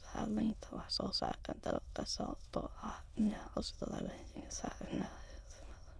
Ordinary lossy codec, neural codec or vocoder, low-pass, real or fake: none; autoencoder, 22.05 kHz, a latent of 192 numbers a frame, VITS, trained on many speakers; none; fake